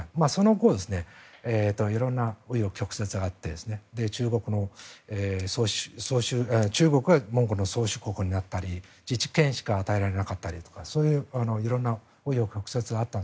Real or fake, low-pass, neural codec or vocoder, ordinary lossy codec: real; none; none; none